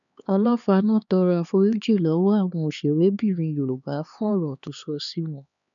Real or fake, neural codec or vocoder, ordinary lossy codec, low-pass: fake; codec, 16 kHz, 2 kbps, X-Codec, HuBERT features, trained on LibriSpeech; none; 7.2 kHz